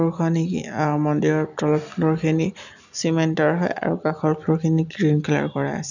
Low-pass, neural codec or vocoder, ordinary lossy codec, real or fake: 7.2 kHz; none; none; real